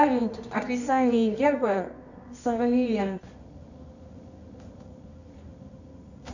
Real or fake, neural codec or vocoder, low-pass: fake; codec, 24 kHz, 0.9 kbps, WavTokenizer, medium music audio release; 7.2 kHz